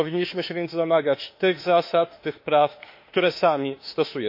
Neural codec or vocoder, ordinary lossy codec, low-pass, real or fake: autoencoder, 48 kHz, 32 numbers a frame, DAC-VAE, trained on Japanese speech; MP3, 32 kbps; 5.4 kHz; fake